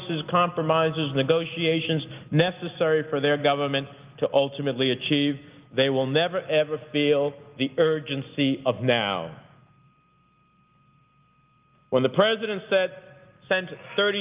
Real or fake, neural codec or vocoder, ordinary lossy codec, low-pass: real; none; Opus, 24 kbps; 3.6 kHz